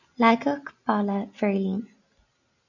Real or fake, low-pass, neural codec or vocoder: real; 7.2 kHz; none